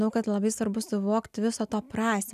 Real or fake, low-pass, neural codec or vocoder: real; 14.4 kHz; none